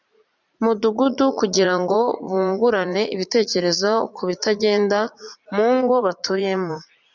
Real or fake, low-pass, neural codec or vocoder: fake; 7.2 kHz; vocoder, 44.1 kHz, 80 mel bands, Vocos